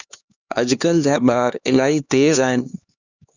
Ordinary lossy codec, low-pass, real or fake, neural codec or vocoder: Opus, 64 kbps; 7.2 kHz; fake; codec, 16 kHz, 2 kbps, X-Codec, HuBERT features, trained on LibriSpeech